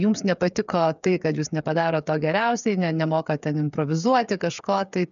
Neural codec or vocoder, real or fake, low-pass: codec, 16 kHz, 8 kbps, FreqCodec, smaller model; fake; 7.2 kHz